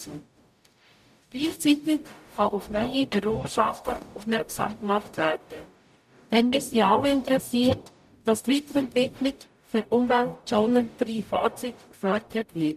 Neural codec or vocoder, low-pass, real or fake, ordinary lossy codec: codec, 44.1 kHz, 0.9 kbps, DAC; 14.4 kHz; fake; none